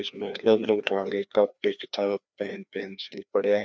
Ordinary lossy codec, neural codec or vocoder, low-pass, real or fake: none; codec, 16 kHz, 2 kbps, FreqCodec, larger model; none; fake